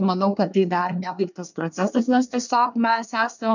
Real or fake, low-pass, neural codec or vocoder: fake; 7.2 kHz; codec, 24 kHz, 1 kbps, SNAC